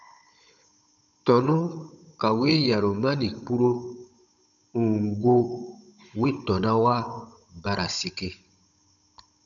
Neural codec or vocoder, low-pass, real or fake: codec, 16 kHz, 16 kbps, FunCodec, trained on Chinese and English, 50 frames a second; 7.2 kHz; fake